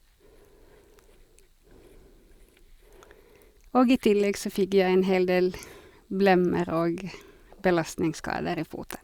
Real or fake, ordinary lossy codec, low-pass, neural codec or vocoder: real; Opus, 64 kbps; 19.8 kHz; none